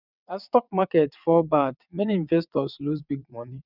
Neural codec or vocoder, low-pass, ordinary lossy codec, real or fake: vocoder, 22.05 kHz, 80 mel bands, WaveNeXt; 5.4 kHz; none; fake